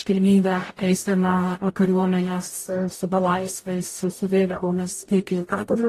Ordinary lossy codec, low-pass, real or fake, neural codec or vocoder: AAC, 48 kbps; 14.4 kHz; fake; codec, 44.1 kHz, 0.9 kbps, DAC